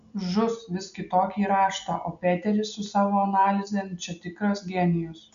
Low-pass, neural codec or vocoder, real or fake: 7.2 kHz; none; real